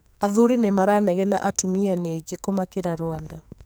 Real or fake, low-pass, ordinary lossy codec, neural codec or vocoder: fake; none; none; codec, 44.1 kHz, 2.6 kbps, SNAC